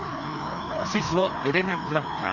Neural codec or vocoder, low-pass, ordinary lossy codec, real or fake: codec, 16 kHz, 2 kbps, FreqCodec, larger model; 7.2 kHz; none; fake